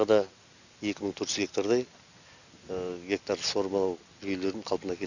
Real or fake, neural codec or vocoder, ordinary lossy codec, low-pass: real; none; none; 7.2 kHz